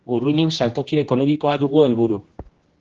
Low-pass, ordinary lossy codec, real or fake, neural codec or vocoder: 7.2 kHz; Opus, 16 kbps; fake; codec, 16 kHz, 1 kbps, X-Codec, HuBERT features, trained on general audio